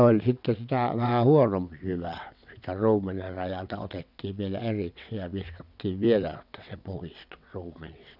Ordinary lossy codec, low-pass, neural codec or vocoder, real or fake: none; 5.4 kHz; vocoder, 22.05 kHz, 80 mel bands, WaveNeXt; fake